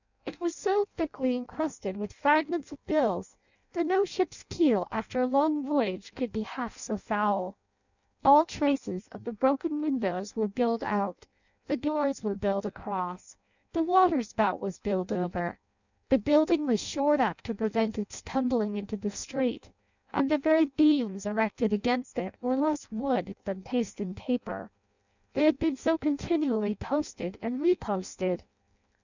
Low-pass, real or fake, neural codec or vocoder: 7.2 kHz; fake; codec, 16 kHz in and 24 kHz out, 0.6 kbps, FireRedTTS-2 codec